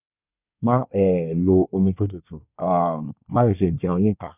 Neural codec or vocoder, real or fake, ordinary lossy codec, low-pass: codec, 24 kHz, 1 kbps, SNAC; fake; none; 3.6 kHz